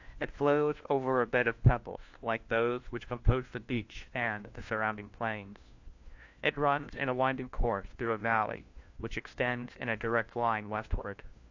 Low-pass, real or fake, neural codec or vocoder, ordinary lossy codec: 7.2 kHz; fake; codec, 16 kHz, 1 kbps, FunCodec, trained on LibriTTS, 50 frames a second; AAC, 48 kbps